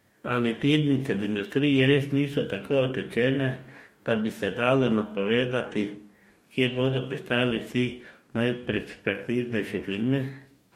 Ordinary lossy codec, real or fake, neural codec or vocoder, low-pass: MP3, 64 kbps; fake; codec, 44.1 kHz, 2.6 kbps, DAC; 19.8 kHz